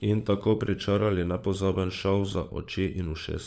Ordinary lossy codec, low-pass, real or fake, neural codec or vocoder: none; none; fake; codec, 16 kHz, 16 kbps, FunCodec, trained on LibriTTS, 50 frames a second